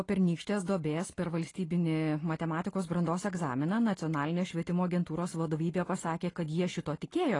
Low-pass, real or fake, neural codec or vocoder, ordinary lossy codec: 10.8 kHz; real; none; AAC, 32 kbps